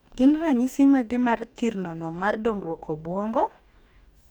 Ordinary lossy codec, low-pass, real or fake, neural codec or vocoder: none; 19.8 kHz; fake; codec, 44.1 kHz, 2.6 kbps, DAC